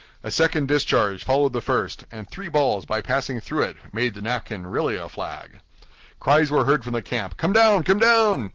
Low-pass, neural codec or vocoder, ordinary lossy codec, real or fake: 7.2 kHz; none; Opus, 16 kbps; real